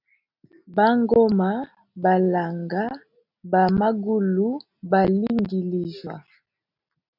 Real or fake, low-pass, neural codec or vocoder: real; 5.4 kHz; none